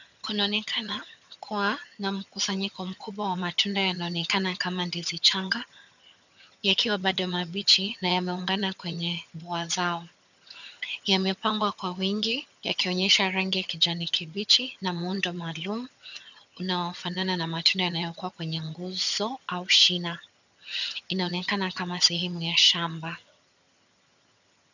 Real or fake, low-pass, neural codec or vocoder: fake; 7.2 kHz; vocoder, 22.05 kHz, 80 mel bands, HiFi-GAN